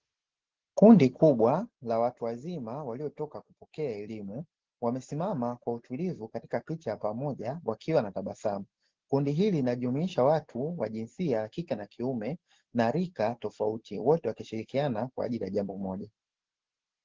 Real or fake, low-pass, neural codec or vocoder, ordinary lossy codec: real; 7.2 kHz; none; Opus, 16 kbps